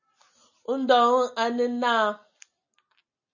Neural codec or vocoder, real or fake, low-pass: none; real; 7.2 kHz